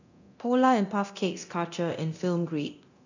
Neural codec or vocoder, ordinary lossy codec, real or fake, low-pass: codec, 24 kHz, 0.9 kbps, DualCodec; none; fake; 7.2 kHz